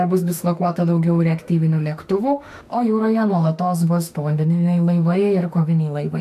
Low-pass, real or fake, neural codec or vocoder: 14.4 kHz; fake; autoencoder, 48 kHz, 32 numbers a frame, DAC-VAE, trained on Japanese speech